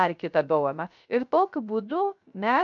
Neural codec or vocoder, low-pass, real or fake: codec, 16 kHz, 0.3 kbps, FocalCodec; 7.2 kHz; fake